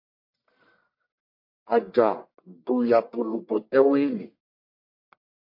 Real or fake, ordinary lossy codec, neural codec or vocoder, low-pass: fake; MP3, 32 kbps; codec, 44.1 kHz, 1.7 kbps, Pupu-Codec; 5.4 kHz